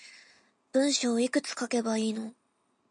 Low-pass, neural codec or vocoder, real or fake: 9.9 kHz; none; real